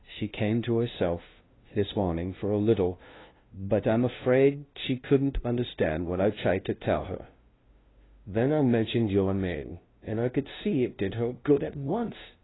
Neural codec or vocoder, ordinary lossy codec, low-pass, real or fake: codec, 16 kHz, 0.5 kbps, FunCodec, trained on LibriTTS, 25 frames a second; AAC, 16 kbps; 7.2 kHz; fake